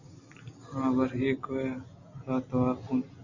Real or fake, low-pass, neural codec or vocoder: real; 7.2 kHz; none